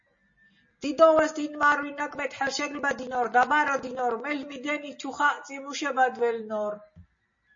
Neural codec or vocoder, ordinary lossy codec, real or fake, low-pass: none; MP3, 32 kbps; real; 7.2 kHz